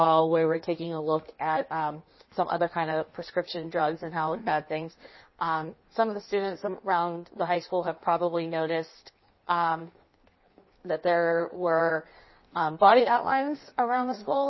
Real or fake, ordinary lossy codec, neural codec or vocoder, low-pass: fake; MP3, 24 kbps; codec, 16 kHz in and 24 kHz out, 1.1 kbps, FireRedTTS-2 codec; 7.2 kHz